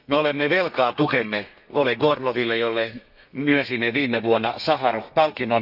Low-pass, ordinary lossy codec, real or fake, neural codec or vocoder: 5.4 kHz; none; fake; codec, 32 kHz, 1.9 kbps, SNAC